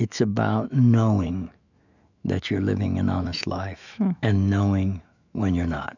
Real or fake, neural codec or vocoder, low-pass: fake; autoencoder, 48 kHz, 128 numbers a frame, DAC-VAE, trained on Japanese speech; 7.2 kHz